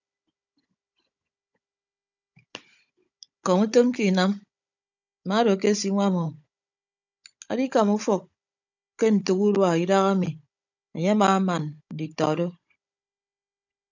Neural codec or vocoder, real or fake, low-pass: codec, 16 kHz, 16 kbps, FunCodec, trained on Chinese and English, 50 frames a second; fake; 7.2 kHz